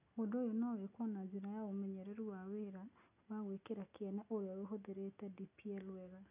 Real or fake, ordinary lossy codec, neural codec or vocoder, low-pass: real; none; none; 3.6 kHz